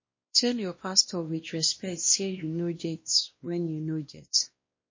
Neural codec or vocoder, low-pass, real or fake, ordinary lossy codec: codec, 16 kHz, 1 kbps, X-Codec, WavLM features, trained on Multilingual LibriSpeech; 7.2 kHz; fake; MP3, 32 kbps